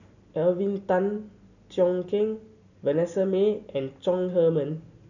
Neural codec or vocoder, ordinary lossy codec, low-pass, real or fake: none; none; 7.2 kHz; real